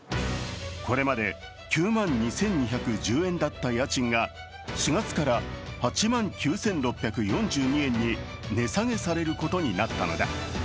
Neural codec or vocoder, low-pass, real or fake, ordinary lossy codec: none; none; real; none